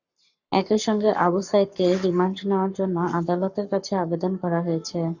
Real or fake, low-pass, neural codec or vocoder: fake; 7.2 kHz; codec, 44.1 kHz, 7.8 kbps, Pupu-Codec